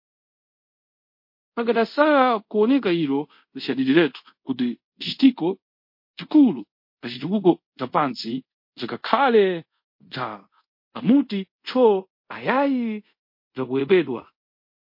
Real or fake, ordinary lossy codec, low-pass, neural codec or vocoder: fake; MP3, 32 kbps; 5.4 kHz; codec, 24 kHz, 0.5 kbps, DualCodec